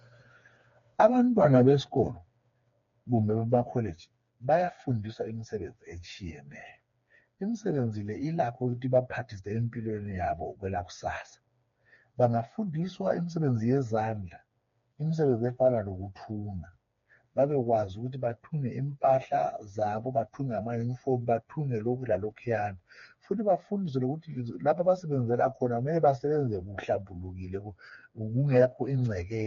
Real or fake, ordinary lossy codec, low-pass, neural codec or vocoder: fake; MP3, 48 kbps; 7.2 kHz; codec, 16 kHz, 4 kbps, FreqCodec, smaller model